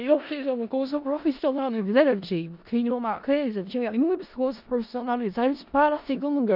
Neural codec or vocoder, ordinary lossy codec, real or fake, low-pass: codec, 16 kHz in and 24 kHz out, 0.4 kbps, LongCat-Audio-Codec, four codebook decoder; none; fake; 5.4 kHz